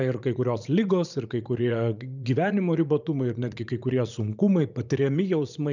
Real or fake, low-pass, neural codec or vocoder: fake; 7.2 kHz; vocoder, 44.1 kHz, 80 mel bands, Vocos